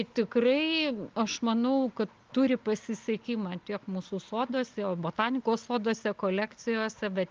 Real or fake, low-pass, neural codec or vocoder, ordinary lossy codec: real; 7.2 kHz; none; Opus, 24 kbps